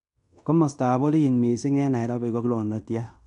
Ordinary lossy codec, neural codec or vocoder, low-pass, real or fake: none; codec, 16 kHz in and 24 kHz out, 0.9 kbps, LongCat-Audio-Codec, fine tuned four codebook decoder; 10.8 kHz; fake